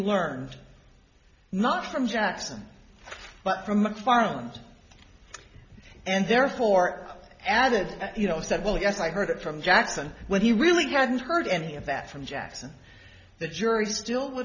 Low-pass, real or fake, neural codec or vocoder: 7.2 kHz; real; none